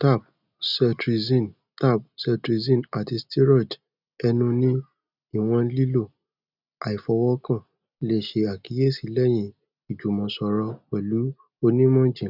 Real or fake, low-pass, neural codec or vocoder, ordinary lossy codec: real; 5.4 kHz; none; none